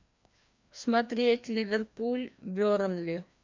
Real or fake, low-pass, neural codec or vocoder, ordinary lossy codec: fake; 7.2 kHz; codec, 16 kHz, 1 kbps, FreqCodec, larger model; MP3, 64 kbps